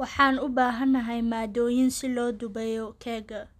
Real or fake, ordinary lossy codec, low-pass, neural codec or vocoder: real; none; 14.4 kHz; none